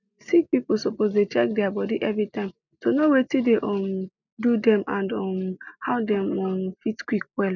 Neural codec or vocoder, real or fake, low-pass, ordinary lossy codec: none; real; 7.2 kHz; none